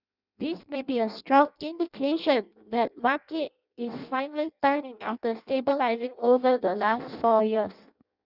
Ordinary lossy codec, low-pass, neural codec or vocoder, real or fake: none; 5.4 kHz; codec, 16 kHz in and 24 kHz out, 0.6 kbps, FireRedTTS-2 codec; fake